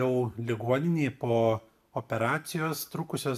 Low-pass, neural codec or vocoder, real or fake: 14.4 kHz; vocoder, 44.1 kHz, 128 mel bands every 256 samples, BigVGAN v2; fake